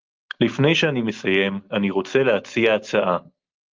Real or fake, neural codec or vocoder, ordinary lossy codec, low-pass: real; none; Opus, 32 kbps; 7.2 kHz